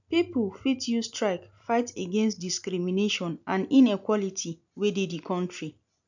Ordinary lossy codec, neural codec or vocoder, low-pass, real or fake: none; none; 7.2 kHz; real